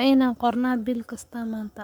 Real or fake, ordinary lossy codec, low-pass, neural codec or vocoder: fake; none; none; codec, 44.1 kHz, 7.8 kbps, Pupu-Codec